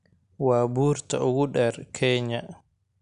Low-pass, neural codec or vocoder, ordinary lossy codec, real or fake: 10.8 kHz; none; none; real